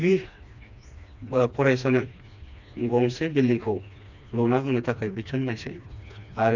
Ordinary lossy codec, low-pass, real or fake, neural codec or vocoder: none; 7.2 kHz; fake; codec, 16 kHz, 2 kbps, FreqCodec, smaller model